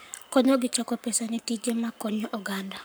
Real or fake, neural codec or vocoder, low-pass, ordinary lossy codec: fake; codec, 44.1 kHz, 7.8 kbps, Pupu-Codec; none; none